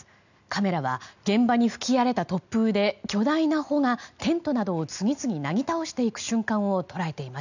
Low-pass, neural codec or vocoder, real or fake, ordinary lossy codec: 7.2 kHz; none; real; none